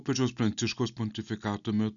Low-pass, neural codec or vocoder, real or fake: 7.2 kHz; none; real